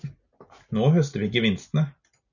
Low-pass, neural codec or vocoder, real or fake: 7.2 kHz; none; real